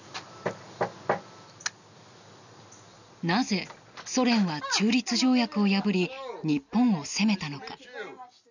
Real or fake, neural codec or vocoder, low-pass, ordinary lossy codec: real; none; 7.2 kHz; none